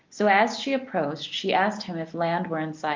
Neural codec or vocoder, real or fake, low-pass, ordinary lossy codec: none; real; 7.2 kHz; Opus, 24 kbps